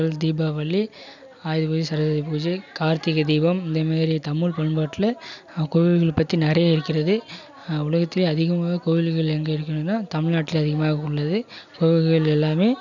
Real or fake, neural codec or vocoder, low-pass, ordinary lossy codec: real; none; 7.2 kHz; none